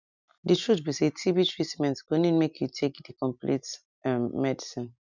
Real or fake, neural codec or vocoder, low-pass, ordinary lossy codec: real; none; 7.2 kHz; none